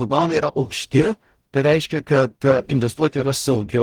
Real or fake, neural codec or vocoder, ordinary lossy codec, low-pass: fake; codec, 44.1 kHz, 0.9 kbps, DAC; Opus, 24 kbps; 19.8 kHz